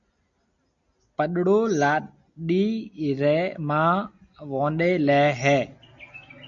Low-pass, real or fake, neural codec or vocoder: 7.2 kHz; real; none